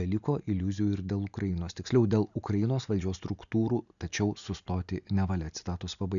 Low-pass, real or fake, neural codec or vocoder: 7.2 kHz; real; none